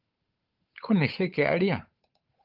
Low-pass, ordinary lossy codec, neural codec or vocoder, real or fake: 5.4 kHz; Opus, 24 kbps; codec, 16 kHz, 8 kbps, FunCodec, trained on Chinese and English, 25 frames a second; fake